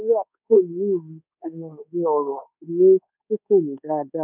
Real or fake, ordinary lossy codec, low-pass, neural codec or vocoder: fake; none; 3.6 kHz; codec, 24 kHz, 1.2 kbps, DualCodec